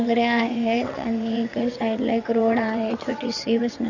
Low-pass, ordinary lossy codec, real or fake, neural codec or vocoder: 7.2 kHz; none; fake; vocoder, 22.05 kHz, 80 mel bands, WaveNeXt